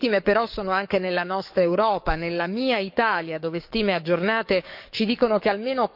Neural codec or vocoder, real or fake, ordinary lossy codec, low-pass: codec, 44.1 kHz, 7.8 kbps, DAC; fake; none; 5.4 kHz